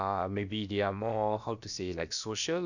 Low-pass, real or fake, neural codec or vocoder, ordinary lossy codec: 7.2 kHz; fake; codec, 16 kHz, about 1 kbps, DyCAST, with the encoder's durations; none